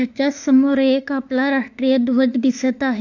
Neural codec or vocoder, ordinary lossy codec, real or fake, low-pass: autoencoder, 48 kHz, 32 numbers a frame, DAC-VAE, trained on Japanese speech; none; fake; 7.2 kHz